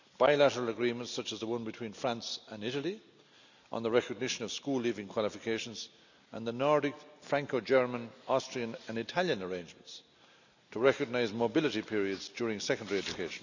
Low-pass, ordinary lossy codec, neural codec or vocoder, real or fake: 7.2 kHz; none; none; real